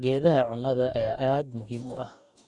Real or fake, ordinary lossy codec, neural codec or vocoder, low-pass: fake; none; codec, 44.1 kHz, 2.6 kbps, DAC; 10.8 kHz